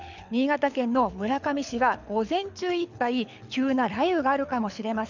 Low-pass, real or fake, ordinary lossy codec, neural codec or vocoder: 7.2 kHz; fake; none; codec, 24 kHz, 6 kbps, HILCodec